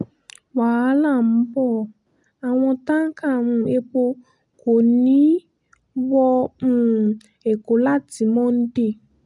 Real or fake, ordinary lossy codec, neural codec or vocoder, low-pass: real; none; none; 10.8 kHz